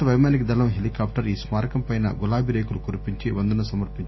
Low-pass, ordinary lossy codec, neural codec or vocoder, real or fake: 7.2 kHz; MP3, 24 kbps; none; real